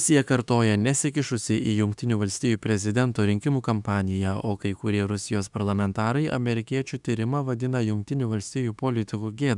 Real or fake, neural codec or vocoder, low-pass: fake; autoencoder, 48 kHz, 32 numbers a frame, DAC-VAE, trained on Japanese speech; 10.8 kHz